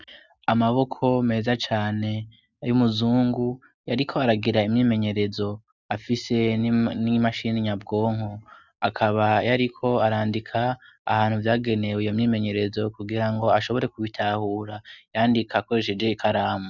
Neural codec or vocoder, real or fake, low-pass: none; real; 7.2 kHz